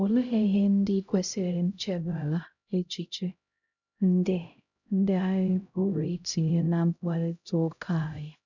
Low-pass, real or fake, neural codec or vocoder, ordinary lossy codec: 7.2 kHz; fake; codec, 16 kHz, 0.5 kbps, X-Codec, HuBERT features, trained on LibriSpeech; none